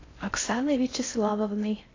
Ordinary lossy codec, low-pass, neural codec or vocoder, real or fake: AAC, 32 kbps; 7.2 kHz; codec, 16 kHz in and 24 kHz out, 0.6 kbps, FocalCodec, streaming, 4096 codes; fake